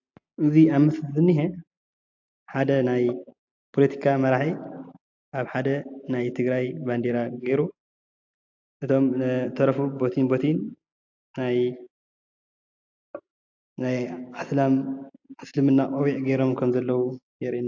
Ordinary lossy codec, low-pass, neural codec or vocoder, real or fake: AAC, 48 kbps; 7.2 kHz; none; real